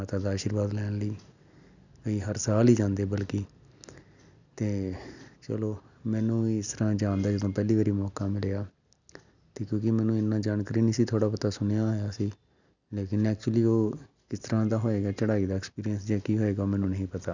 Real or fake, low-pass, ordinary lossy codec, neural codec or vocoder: real; 7.2 kHz; none; none